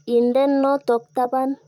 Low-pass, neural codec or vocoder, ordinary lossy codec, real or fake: 19.8 kHz; vocoder, 44.1 kHz, 128 mel bands, Pupu-Vocoder; none; fake